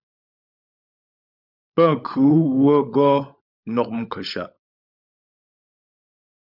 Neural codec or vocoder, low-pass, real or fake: codec, 16 kHz, 16 kbps, FunCodec, trained on LibriTTS, 50 frames a second; 5.4 kHz; fake